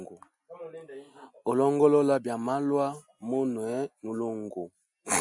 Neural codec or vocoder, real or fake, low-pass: none; real; 10.8 kHz